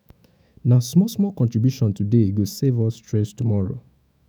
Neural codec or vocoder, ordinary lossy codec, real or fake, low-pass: autoencoder, 48 kHz, 128 numbers a frame, DAC-VAE, trained on Japanese speech; none; fake; none